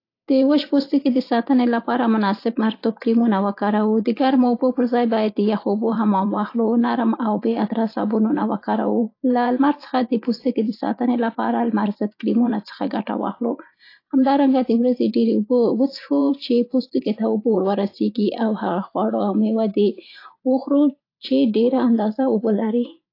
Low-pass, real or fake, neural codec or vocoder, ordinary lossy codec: 5.4 kHz; fake; vocoder, 44.1 kHz, 128 mel bands every 512 samples, BigVGAN v2; AAC, 32 kbps